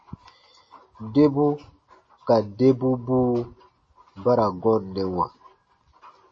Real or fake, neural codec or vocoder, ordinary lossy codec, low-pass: real; none; MP3, 32 kbps; 9.9 kHz